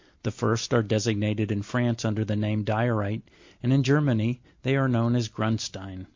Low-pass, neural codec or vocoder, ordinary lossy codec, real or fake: 7.2 kHz; none; MP3, 48 kbps; real